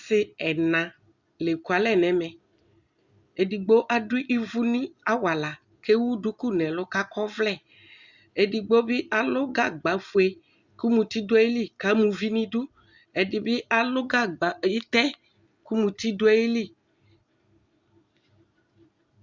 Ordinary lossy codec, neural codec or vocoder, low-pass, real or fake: Opus, 64 kbps; none; 7.2 kHz; real